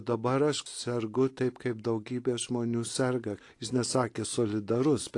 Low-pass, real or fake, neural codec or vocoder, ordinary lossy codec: 10.8 kHz; real; none; AAC, 48 kbps